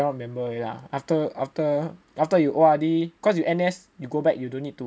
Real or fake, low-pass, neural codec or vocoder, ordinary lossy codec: real; none; none; none